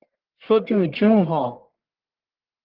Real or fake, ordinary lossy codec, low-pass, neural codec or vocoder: fake; Opus, 16 kbps; 5.4 kHz; codec, 44.1 kHz, 1.7 kbps, Pupu-Codec